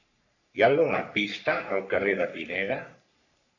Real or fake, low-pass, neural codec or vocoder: fake; 7.2 kHz; codec, 44.1 kHz, 3.4 kbps, Pupu-Codec